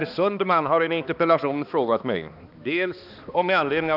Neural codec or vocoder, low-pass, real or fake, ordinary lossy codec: codec, 16 kHz, 2 kbps, X-Codec, HuBERT features, trained on balanced general audio; 5.4 kHz; fake; none